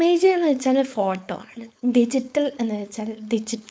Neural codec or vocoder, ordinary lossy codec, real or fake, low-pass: codec, 16 kHz, 4.8 kbps, FACodec; none; fake; none